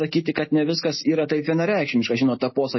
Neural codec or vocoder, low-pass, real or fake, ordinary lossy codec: none; 7.2 kHz; real; MP3, 24 kbps